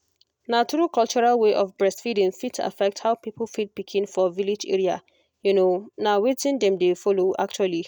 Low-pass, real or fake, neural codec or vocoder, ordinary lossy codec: 19.8 kHz; real; none; none